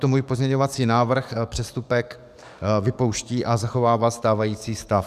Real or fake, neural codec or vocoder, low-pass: fake; codec, 44.1 kHz, 7.8 kbps, DAC; 14.4 kHz